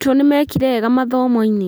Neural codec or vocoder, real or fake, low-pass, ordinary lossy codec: none; real; none; none